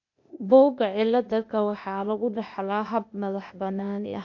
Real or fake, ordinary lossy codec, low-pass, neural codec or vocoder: fake; MP3, 48 kbps; 7.2 kHz; codec, 16 kHz, 0.8 kbps, ZipCodec